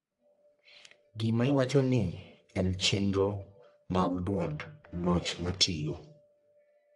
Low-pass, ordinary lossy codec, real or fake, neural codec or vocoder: 10.8 kHz; AAC, 64 kbps; fake; codec, 44.1 kHz, 1.7 kbps, Pupu-Codec